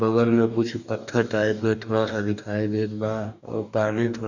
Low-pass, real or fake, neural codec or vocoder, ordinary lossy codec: 7.2 kHz; fake; codec, 44.1 kHz, 2.6 kbps, DAC; none